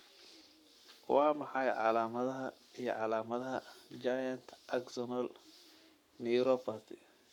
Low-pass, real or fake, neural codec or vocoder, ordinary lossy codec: 19.8 kHz; fake; codec, 44.1 kHz, 7.8 kbps, Pupu-Codec; none